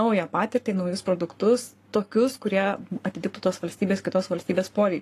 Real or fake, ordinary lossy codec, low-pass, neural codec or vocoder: fake; AAC, 48 kbps; 14.4 kHz; codec, 44.1 kHz, 7.8 kbps, Pupu-Codec